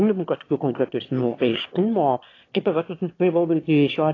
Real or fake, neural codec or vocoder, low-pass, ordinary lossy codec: fake; autoencoder, 22.05 kHz, a latent of 192 numbers a frame, VITS, trained on one speaker; 7.2 kHz; AAC, 32 kbps